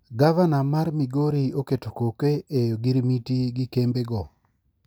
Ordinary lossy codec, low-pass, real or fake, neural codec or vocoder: none; none; real; none